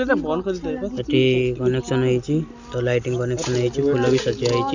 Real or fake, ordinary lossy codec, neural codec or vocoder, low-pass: real; none; none; 7.2 kHz